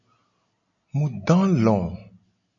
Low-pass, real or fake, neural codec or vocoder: 7.2 kHz; real; none